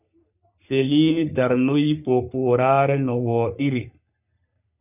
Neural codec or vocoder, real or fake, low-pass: codec, 44.1 kHz, 3.4 kbps, Pupu-Codec; fake; 3.6 kHz